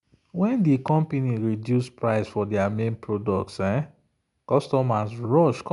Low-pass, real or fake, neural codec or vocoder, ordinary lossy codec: 10.8 kHz; real; none; none